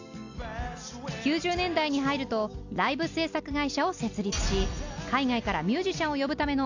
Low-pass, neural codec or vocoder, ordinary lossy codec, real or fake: 7.2 kHz; none; AAC, 48 kbps; real